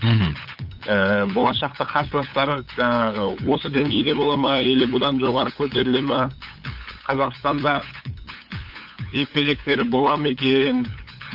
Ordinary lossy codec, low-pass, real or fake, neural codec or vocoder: AAC, 48 kbps; 5.4 kHz; fake; codec, 16 kHz, 8 kbps, FunCodec, trained on LibriTTS, 25 frames a second